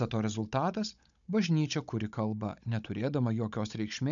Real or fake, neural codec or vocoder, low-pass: fake; codec, 16 kHz, 16 kbps, FunCodec, trained on Chinese and English, 50 frames a second; 7.2 kHz